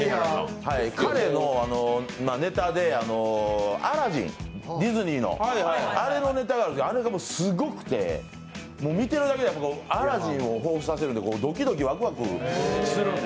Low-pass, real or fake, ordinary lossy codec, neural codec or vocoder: none; real; none; none